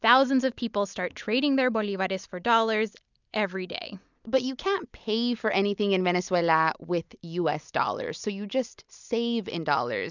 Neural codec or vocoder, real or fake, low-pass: none; real; 7.2 kHz